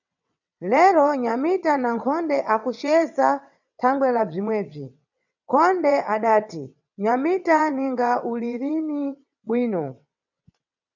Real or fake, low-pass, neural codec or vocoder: fake; 7.2 kHz; vocoder, 22.05 kHz, 80 mel bands, WaveNeXt